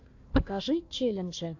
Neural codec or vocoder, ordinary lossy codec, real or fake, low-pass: codec, 16 kHz, 1 kbps, FunCodec, trained on Chinese and English, 50 frames a second; AAC, 48 kbps; fake; 7.2 kHz